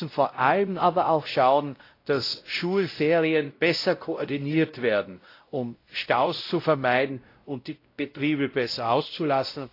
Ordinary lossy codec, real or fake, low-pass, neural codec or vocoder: AAC, 32 kbps; fake; 5.4 kHz; codec, 16 kHz, 0.5 kbps, X-Codec, WavLM features, trained on Multilingual LibriSpeech